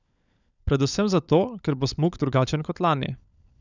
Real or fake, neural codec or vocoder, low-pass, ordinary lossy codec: fake; codec, 16 kHz, 16 kbps, FunCodec, trained on LibriTTS, 50 frames a second; 7.2 kHz; none